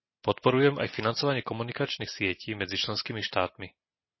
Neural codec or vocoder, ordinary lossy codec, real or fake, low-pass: none; MP3, 24 kbps; real; 7.2 kHz